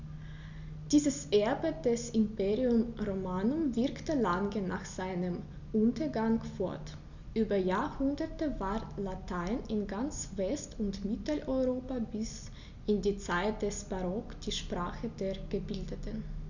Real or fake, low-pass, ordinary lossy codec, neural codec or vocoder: real; 7.2 kHz; none; none